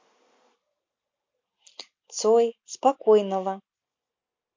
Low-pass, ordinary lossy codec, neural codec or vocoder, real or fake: 7.2 kHz; MP3, 48 kbps; none; real